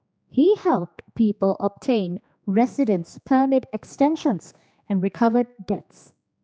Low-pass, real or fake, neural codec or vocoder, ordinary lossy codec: none; fake; codec, 16 kHz, 2 kbps, X-Codec, HuBERT features, trained on general audio; none